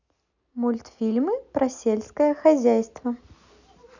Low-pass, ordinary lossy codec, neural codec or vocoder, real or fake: 7.2 kHz; none; none; real